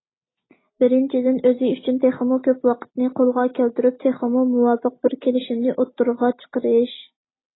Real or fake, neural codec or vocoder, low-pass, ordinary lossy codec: real; none; 7.2 kHz; AAC, 16 kbps